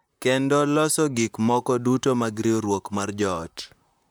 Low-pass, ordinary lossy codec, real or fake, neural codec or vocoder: none; none; fake; vocoder, 44.1 kHz, 128 mel bands, Pupu-Vocoder